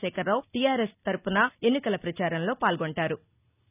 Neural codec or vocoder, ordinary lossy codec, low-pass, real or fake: none; none; 3.6 kHz; real